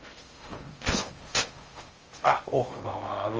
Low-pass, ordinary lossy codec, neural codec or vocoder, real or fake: 7.2 kHz; Opus, 24 kbps; codec, 16 kHz in and 24 kHz out, 0.6 kbps, FocalCodec, streaming, 4096 codes; fake